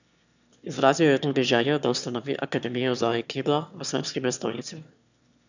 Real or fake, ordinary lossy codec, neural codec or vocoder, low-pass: fake; none; autoencoder, 22.05 kHz, a latent of 192 numbers a frame, VITS, trained on one speaker; 7.2 kHz